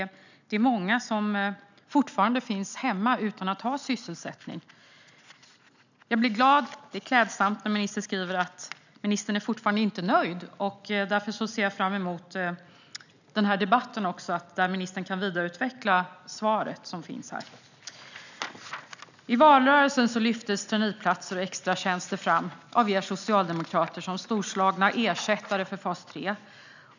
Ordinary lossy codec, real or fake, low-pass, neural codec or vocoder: none; real; 7.2 kHz; none